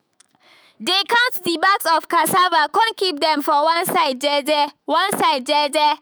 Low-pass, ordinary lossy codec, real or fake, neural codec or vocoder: none; none; fake; autoencoder, 48 kHz, 128 numbers a frame, DAC-VAE, trained on Japanese speech